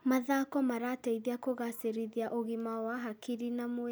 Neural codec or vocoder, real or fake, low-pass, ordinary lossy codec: none; real; none; none